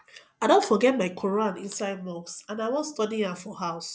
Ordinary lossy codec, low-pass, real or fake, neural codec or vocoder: none; none; real; none